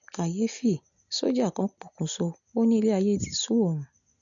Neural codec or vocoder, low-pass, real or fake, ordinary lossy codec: none; 7.2 kHz; real; MP3, 64 kbps